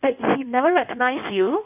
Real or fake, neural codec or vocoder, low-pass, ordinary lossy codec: fake; codec, 16 kHz in and 24 kHz out, 1.1 kbps, FireRedTTS-2 codec; 3.6 kHz; none